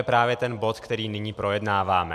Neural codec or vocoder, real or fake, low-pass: none; real; 14.4 kHz